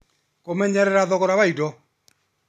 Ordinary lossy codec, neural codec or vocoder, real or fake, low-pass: none; none; real; 14.4 kHz